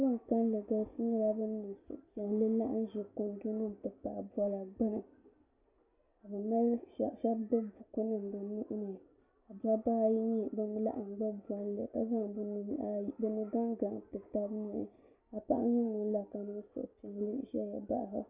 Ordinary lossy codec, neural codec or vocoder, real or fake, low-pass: Opus, 64 kbps; none; real; 3.6 kHz